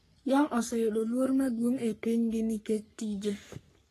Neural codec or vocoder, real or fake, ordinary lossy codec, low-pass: codec, 44.1 kHz, 3.4 kbps, Pupu-Codec; fake; AAC, 48 kbps; 14.4 kHz